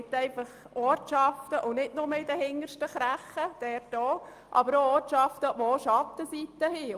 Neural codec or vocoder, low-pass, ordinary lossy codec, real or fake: none; 14.4 kHz; Opus, 32 kbps; real